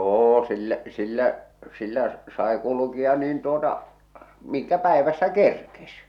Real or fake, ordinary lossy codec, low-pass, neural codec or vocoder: real; none; 19.8 kHz; none